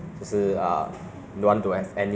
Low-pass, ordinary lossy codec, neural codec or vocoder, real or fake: none; none; none; real